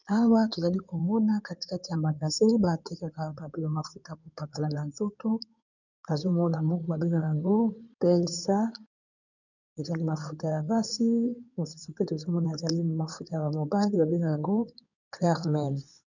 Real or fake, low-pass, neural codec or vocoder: fake; 7.2 kHz; codec, 16 kHz in and 24 kHz out, 2.2 kbps, FireRedTTS-2 codec